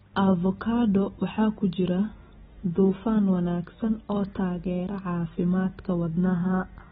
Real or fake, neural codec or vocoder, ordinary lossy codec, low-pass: real; none; AAC, 16 kbps; 10.8 kHz